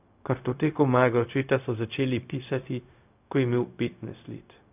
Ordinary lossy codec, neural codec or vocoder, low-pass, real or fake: none; codec, 16 kHz, 0.4 kbps, LongCat-Audio-Codec; 3.6 kHz; fake